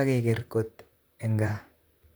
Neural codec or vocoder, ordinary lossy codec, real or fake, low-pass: vocoder, 44.1 kHz, 128 mel bands, Pupu-Vocoder; none; fake; none